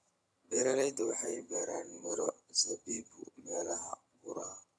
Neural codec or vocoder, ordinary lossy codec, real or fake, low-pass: vocoder, 22.05 kHz, 80 mel bands, HiFi-GAN; none; fake; none